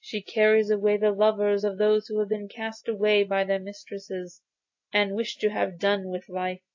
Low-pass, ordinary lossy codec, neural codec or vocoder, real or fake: 7.2 kHz; AAC, 48 kbps; none; real